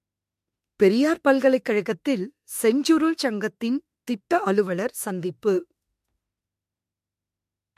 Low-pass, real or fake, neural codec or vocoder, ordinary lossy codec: 14.4 kHz; fake; autoencoder, 48 kHz, 32 numbers a frame, DAC-VAE, trained on Japanese speech; MP3, 64 kbps